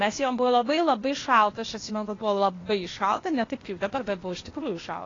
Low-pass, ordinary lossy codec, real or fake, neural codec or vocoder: 7.2 kHz; AAC, 32 kbps; fake; codec, 16 kHz, 0.8 kbps, ZipCodec